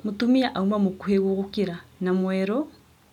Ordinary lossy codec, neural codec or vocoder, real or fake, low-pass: none; none; real; 19.8 kHz